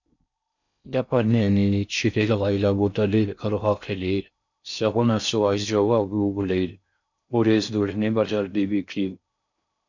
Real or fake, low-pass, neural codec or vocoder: fake; 7.2 kHz; codec, 16 kHz in and 24 kHz out, 0.6 kbps, FocalCodec, streaming, 4096 codes